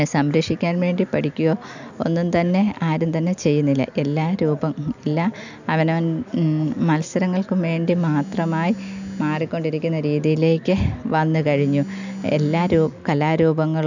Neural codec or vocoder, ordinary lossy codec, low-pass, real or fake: none; none; 7.2 kHz; real